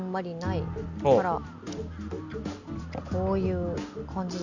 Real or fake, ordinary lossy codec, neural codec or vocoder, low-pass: real; none; none; 7.2 kHz